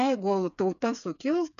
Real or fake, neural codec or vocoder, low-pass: fake; codec, 16 kHz, 4 kbps, FreqCodec, smaller model; 7.2 kHz